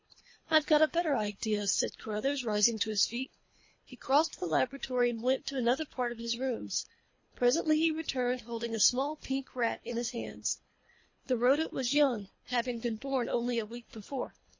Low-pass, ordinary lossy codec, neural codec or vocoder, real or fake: 7.2 kHz; MP3, 32 kbps; codec, 24 kHz, 3 kbps, HILCodec; fake